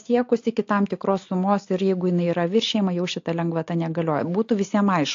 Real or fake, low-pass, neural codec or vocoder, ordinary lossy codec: real; 7.2 kHz; none; AAC, 48 kbps